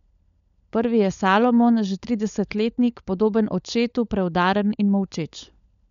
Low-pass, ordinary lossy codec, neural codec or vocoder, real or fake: 7.2 kHz; none; codec, 16 kHz, 16 kbps, FunCodec, trained on LibriTTS, 50 frames a second; fake